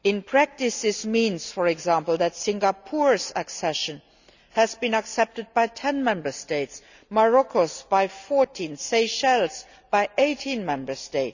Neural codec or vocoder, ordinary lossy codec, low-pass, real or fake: none; none; 7.2 kHz; real